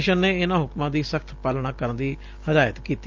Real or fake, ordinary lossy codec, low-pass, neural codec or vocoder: real; Opus, 32 kbps; 7.2 kHz; none